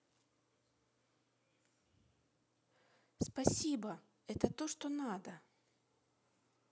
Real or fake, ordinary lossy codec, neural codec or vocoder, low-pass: real; none; none; none